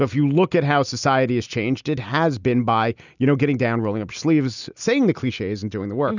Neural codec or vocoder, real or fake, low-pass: none; real; 7.2 kHz